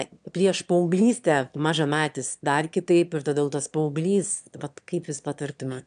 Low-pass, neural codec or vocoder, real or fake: 9.9 kHz; autoencoder, 22.05 kHz, a latent of 192 numbers a frame, VITS, trained on one speaker; fake